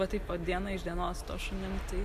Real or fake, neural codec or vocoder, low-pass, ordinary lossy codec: real; none; 14.4 kHz; MP3, 96 kbps